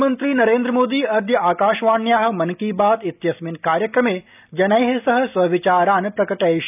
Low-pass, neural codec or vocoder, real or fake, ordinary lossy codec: 3.6 kHz; none; real; none